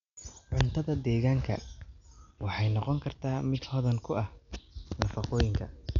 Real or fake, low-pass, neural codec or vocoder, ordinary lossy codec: real; 7.2 kHz; none; none